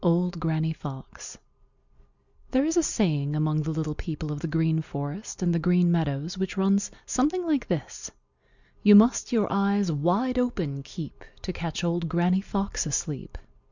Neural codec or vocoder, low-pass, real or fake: none; 7.2 kHz; real